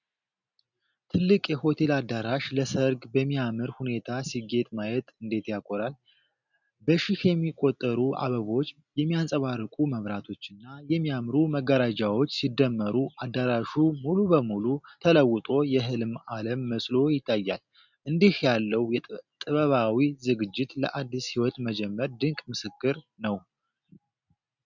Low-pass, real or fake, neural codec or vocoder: 7.2 kHz; real; none